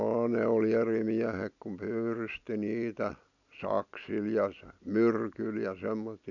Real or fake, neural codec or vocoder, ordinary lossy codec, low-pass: real; none; none; 7.2 kHz